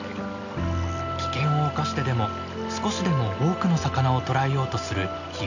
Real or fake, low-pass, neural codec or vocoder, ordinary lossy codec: real; 7.2 kHz; none; none